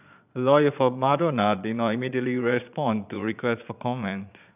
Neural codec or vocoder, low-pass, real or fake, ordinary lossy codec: vocoder, 22.05 kHz, 80 mel bands, Vocos; 3.6 kHz; fake; none